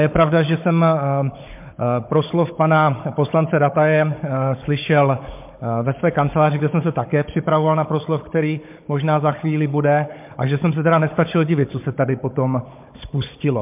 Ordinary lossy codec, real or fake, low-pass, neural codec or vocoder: MP3, 32 kbps; fake; 3.6 kHz; codec, 16 kHz, 16 kbps, FunCodec, trained on Chinese and English, 50 frames a second